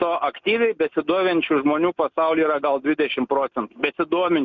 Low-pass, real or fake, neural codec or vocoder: 7.2 kHz; real; none